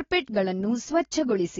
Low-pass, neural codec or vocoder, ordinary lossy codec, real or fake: 7.2 kHz; none; AAC, 24 kbps; real